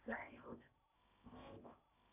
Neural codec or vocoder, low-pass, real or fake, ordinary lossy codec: codec, 16 kHz in and 24 kHz out, 0.8 kbps, FocalCodec, streaming, 65536 codes; 3.6 kHz; fake; AAC, 32 kbps